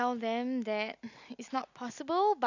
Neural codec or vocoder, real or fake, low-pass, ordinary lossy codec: none; real; 7.2 kHz; none